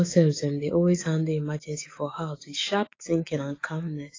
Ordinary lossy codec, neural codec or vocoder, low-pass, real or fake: AAC, 32 kbps; autoencoder, 48 kHz, 128 numbers a frame, DAC-VAE, trained on Japanese speech; 7.2 kHz; fake